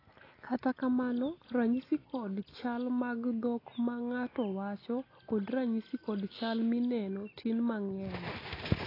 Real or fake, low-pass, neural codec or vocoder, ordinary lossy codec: real; 5.4 kHz; none; AAC, 24 kbps